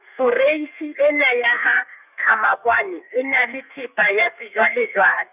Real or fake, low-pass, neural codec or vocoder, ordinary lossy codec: fake; 3.6 kHz; codec, 32 kHz, 1.9 kbps, SNAC; none